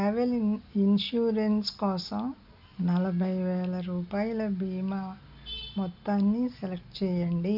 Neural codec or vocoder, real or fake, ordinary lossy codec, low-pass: none; real; none; 5.4 kHz